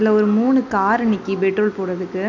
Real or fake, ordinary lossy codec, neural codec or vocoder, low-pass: real; none; none; 7.2 kHz